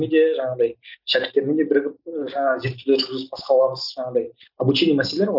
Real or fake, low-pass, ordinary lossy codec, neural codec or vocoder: real; 5.4 kHz; none; none